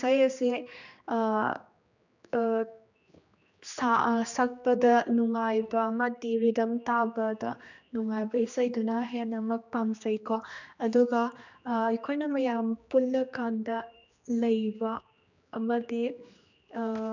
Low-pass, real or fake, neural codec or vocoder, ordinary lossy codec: 7.2 kHz; fake; codec, 16 kHz, 2 kbps, X-Codec, HuBERT features, trained on general audio; none